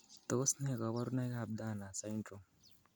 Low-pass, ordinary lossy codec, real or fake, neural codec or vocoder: none; none; real; none